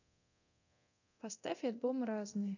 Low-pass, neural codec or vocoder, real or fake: 7.2 kHz; codec, 24 kHz, 0.9 kbps, DualCodec; fake